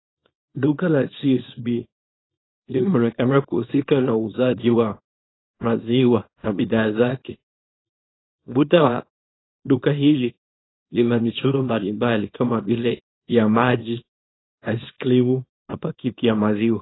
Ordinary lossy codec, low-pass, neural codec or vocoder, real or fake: AAC, 16 kbps; 7.2 kHz; codec, 24 kHz, 0.9 kbps, WavTokenizer, small release; fake